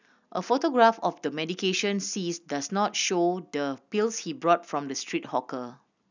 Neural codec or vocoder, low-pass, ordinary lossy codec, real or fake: none; 7.2 kHz; none; real